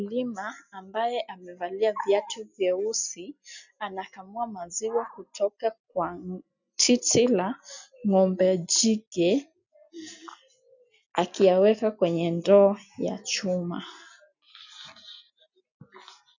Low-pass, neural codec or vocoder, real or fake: 7.2 kHz; none; real